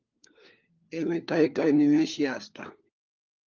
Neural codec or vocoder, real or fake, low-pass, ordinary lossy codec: codec, 16 kHz, 4 kbps, FunCodec, trained on LibriTTS, 50 frames a second; fake; 7.2 kHz; Opus, 32 kbps